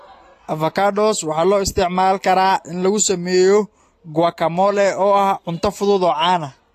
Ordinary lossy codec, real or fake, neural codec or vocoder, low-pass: AAC, 48 kbps; real; none; 14.4 kHz